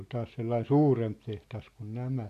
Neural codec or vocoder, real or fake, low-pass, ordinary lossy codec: none; real; 14.4 kHz; AAC, 64 kbps